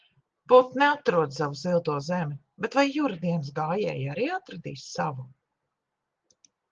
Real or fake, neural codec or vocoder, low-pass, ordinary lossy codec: real; none; 7.2 kHz; Opus, 16 kbps